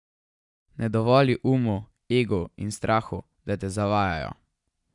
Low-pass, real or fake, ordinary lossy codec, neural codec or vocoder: 10.8 kHz; real; none; none